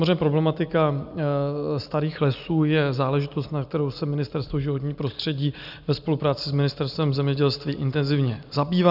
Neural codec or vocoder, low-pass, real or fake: none; 5.4 kHz; real